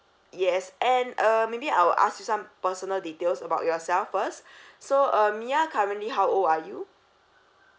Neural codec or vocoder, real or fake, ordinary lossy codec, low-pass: none; real; none; none